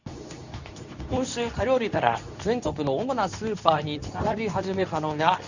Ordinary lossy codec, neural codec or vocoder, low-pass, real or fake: none; codec, 24 kHz, 0.9 kbps, WavTokenizer, medium speech release version 2; 7.2 kHz; fake